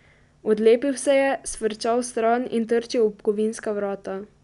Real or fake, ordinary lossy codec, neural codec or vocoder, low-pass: real; none; none; 10.8 kHz